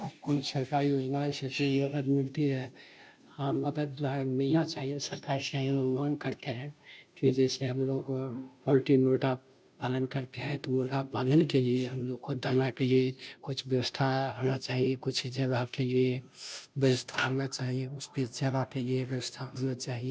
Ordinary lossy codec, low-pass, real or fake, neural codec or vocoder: none; none; fake; codec, 16 kHz, 0.5 kbps, FunCodec, trained on Chinese and English, 25 frames a second